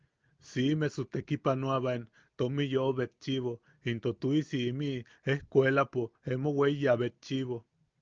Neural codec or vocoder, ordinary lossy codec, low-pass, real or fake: none; Opus, 24 kbps; 7.2 kHz; real